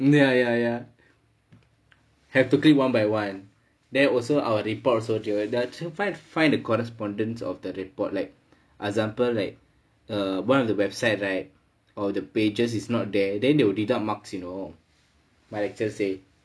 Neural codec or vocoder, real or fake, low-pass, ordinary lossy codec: none; real; none; none